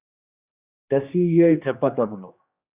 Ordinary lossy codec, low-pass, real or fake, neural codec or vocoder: Opus, 64 kbps; 3.6 kHz; fake; codec, 16 kHz, 2 kbps, X-Codec, HuBERT features, trained on general audio